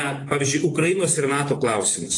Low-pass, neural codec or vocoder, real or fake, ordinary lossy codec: 10.8 kHz; vocoder, 24 kHz, 100 mel bands, Vocos; fake; AAC, 32 kbps